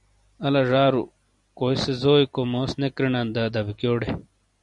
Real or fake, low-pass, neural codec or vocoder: fake; 10.8 kHz; vocoder, 44.1 kHz, 128 mel bands every 256 samples, BigVGAN v2